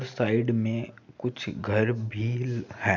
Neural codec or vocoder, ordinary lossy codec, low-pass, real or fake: none; none; 7.2 kHz; real